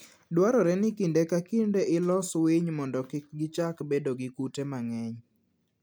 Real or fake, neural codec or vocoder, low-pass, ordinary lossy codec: real; none; none; none